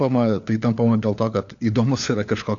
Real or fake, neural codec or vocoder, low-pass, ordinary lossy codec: fake; codec, 16 kHz, 4 kbps, FunCodec, trained on LibriTTS, 50 frames a second; 7.2 kHz; AAC, 64 kbps